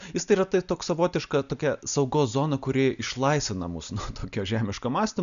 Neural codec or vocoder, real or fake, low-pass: none; real; 7.2 kHz